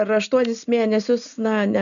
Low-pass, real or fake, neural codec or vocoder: 7.2 kHz; real; none